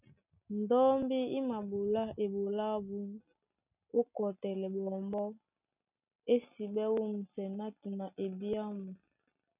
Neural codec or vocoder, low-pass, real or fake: none; 3.6 kHz; real